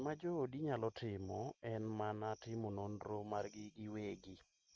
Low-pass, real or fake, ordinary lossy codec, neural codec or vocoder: 7.2 kHz; real; Opus, 24 kbps; none